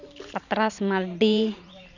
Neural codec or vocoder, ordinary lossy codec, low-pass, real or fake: vocoder, 44.1 kHz, 128 mel bands every 512 samples, BigVGAN v2; none; 7.2 kHz; fake